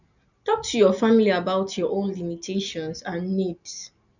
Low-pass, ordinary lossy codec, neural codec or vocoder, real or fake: 7.2 kHz; none; none; real